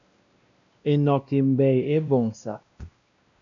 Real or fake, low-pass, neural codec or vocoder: fake; 7.2 kHz; codec, 16 kHz, 1 kbps, X-Codec, WavLM features, trained on Multilingual LibriSpeech